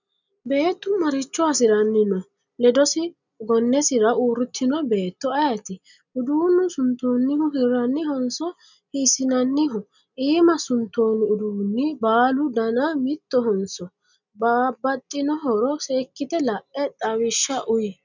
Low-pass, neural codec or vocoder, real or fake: 7.2 kHz; none; real